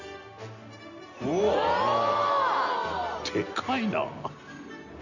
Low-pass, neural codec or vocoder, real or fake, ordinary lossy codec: 7.2 kHz; none; real; none